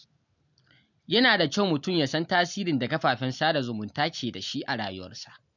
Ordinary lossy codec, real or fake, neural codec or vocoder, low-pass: none; real; none; 7.2 kHz